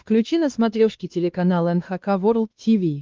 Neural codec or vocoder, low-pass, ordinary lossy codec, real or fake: codec, 16 kHz, 2 kbps, X-Codec, WavLM features, trained on Multilingual LibriSpeech; 7.2 kHz; Opus, 32 kbps; fake